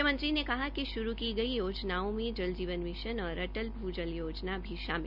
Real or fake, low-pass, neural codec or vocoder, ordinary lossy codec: real; 5.4 kHz; none; none